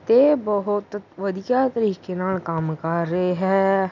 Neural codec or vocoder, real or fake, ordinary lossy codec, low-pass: none; real; none; 7.2 kHz